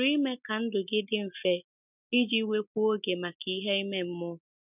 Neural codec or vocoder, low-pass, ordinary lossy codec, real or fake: none; 3.6 kHz; none; real